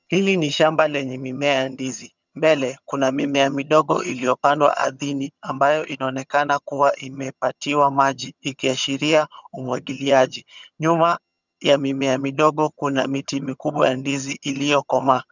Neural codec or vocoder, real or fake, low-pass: vocoder, 22.05 kHz, 80 mel bands, HiFi-GAN; fake; 7.2 kHz